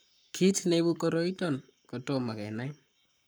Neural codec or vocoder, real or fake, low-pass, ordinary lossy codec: codec, 44.1 kHz, 7.8 kbps, Pupu-Codec; fake; none; none